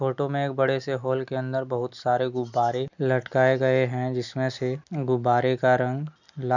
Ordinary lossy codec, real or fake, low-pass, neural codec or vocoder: none; real; 7.2 kHz; none